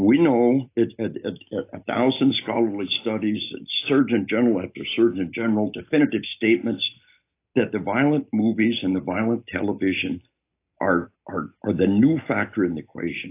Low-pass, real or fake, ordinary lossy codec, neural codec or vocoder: 3.6 kHz; real; AAC, 24 kbps; none